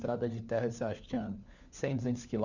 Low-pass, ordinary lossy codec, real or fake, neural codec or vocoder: 7.2 kHz; none; fake; codec, 16 kHz, 4 kbps, FunCodec, trained on LibriTTS, 50 frames a second